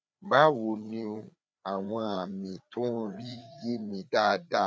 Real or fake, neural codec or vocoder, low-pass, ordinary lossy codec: fake; codec, 16 kHz, 4 kbps, FreqCodec, larger model; none; none